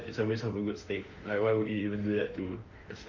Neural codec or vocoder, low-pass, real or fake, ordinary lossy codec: autoencoder, 48 kHz, 32 numbers a frame, DAC-VAE, trained on Japanese speech; 7.2 kHz; fake; Opus, 32 kbps